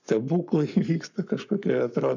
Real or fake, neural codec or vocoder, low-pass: fake; codec, 44.1 kHz, 7.8 kbps, Pupu-Codec; 7.2 kHz